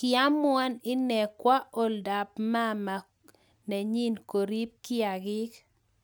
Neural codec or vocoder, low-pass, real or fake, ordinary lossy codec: none; none; real; none